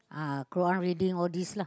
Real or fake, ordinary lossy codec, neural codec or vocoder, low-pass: real; none; none; none